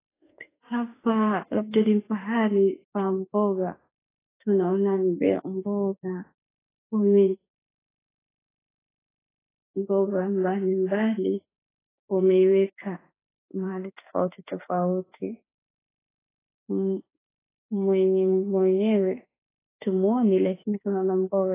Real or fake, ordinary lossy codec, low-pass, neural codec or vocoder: fake; AAC, 16 kbps; 3.6 kHz; autoencoder, 48 kHz, 32 numbers a frame, DAC-VAE, trained on Japanese speech